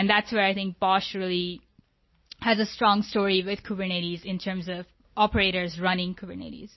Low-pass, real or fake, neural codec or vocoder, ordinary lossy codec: 7.2 kHz; real; none; MP3, 24 kbps